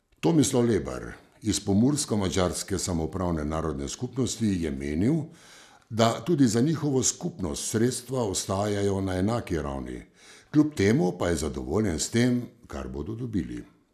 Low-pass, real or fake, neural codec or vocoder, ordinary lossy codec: 14.4 kHz; real; none; none